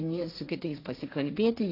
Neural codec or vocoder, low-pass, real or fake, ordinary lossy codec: codec, 16 kHz, 1.1 kbps, Voila-Tokenizer; 5.4 kHz; fake; AAC, 32 kbps